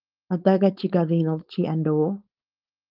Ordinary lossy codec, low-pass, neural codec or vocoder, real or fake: Opus, 24 kbps; 5.4 kHz; codec, 16 kHz, 4.8 kbps, FACodec; fake